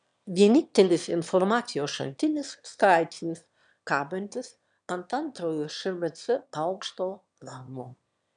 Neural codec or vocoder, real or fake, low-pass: autoencoder, 22.05 kHz, a latent of 192 numbers a frame, VITS, trained on one speaker; fake; 9.9 kHz